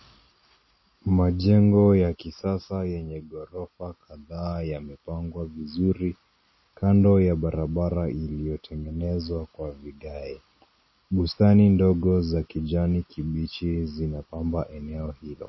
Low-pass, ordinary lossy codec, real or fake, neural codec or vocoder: 7.2 kHz; MP3, 24 kbps; real; none